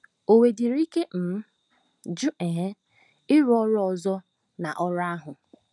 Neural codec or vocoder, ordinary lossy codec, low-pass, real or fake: none; none; 10.8 kHz; real